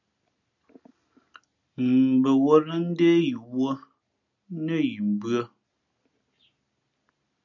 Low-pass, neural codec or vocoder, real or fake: 7.2 kHz; none; real